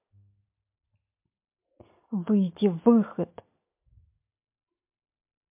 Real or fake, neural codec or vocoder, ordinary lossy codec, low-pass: real; none; MP3, 32 kbps; 3.6 kHz